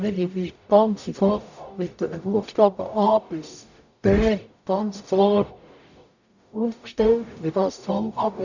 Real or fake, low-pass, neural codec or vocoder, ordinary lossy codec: fake; 7.2 kHz; codec, 44.1 kHz, 0.9 kbps, DAC; none